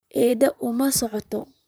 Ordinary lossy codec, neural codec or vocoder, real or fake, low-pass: none; vocoder, 44.1 kHz, 128 mel bands, Pupu-Vocoder; fake; none